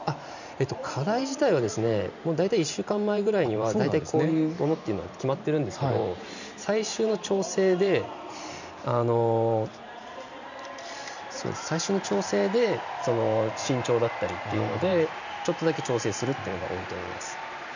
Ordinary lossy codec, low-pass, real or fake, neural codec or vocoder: none; 7.2 kHz; fake; vocoder, 44.1 kHz, 128 mel bands every 512 samples, BigVGAN v2